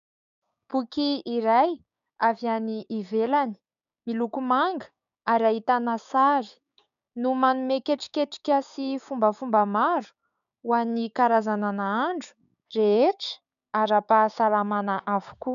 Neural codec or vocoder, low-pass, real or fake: codec, 16 kHz, 6 kbps, DAC; 7.2 kHz; fake